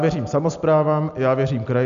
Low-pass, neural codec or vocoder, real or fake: 7.2 kHz; none; real